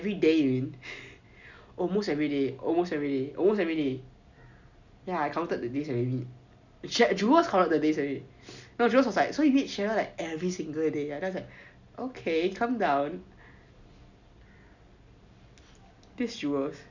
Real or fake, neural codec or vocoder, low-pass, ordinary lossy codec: real; none; 7.2 kHz; none